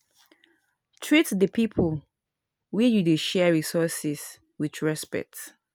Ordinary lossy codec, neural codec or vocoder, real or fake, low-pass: none; none; real; none